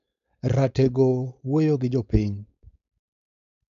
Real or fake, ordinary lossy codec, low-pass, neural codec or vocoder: fake; none; 7.2 kHz; codec, 16 kHz, 4.8 kbps, FACodec